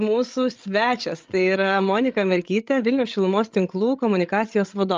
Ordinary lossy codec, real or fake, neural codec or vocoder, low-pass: Opus, 24 kbps; fake; codec, 16 kHz, 16 kbps, FreqCodec, larger model; 7.2 kHz